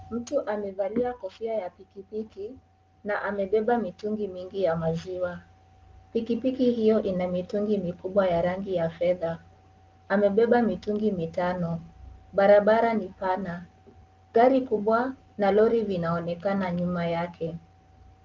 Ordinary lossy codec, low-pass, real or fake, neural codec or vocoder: Opus, 24 kbps; 7.2 kHz; real; none